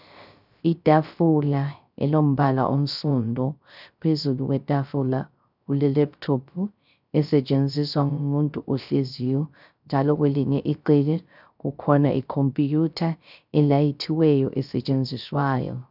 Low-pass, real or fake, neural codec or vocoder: 5.4 kHz; fake; codec, 16 kHz, 0.3 kbps, FocalCodec